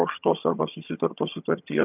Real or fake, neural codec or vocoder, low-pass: fake; vocoder, 22.05 kHz, 80 mel bands, HiFi-GAN; 3.6 kHz